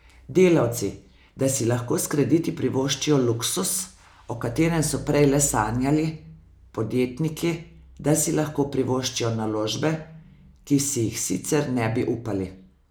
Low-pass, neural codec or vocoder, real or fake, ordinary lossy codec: none; none; real; none